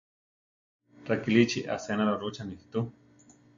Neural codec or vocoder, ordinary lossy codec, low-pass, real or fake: none; MP3, 48 kbps; 7.2 kHz; real